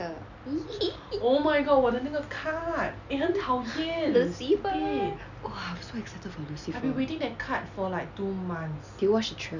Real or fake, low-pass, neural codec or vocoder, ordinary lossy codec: real; 7.2 kHz; none; none